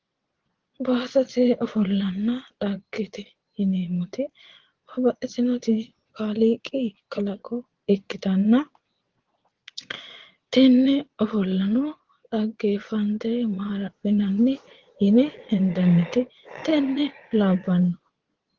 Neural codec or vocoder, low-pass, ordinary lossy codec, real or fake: vocoder, 22.05 kHz, 80 mel bands, Vocos; 7.2 kHz; Opus, 16 kbps; fake